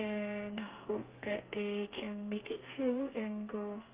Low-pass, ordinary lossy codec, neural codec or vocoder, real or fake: 3.6 kHz; Opus, 24 kbps; codec, 32 kHz, 1.9 kbps, SNAC; fake